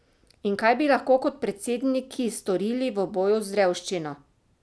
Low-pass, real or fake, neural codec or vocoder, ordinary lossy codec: none; real; none; none